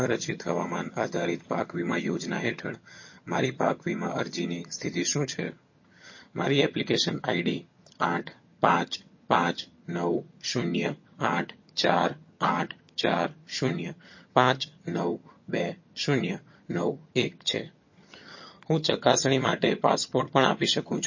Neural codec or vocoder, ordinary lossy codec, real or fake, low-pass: vocoder, 22.05 kHz, 80 mel bands, HiFi-GAN; MP3, 32 kbps; fake; 7.2 kHz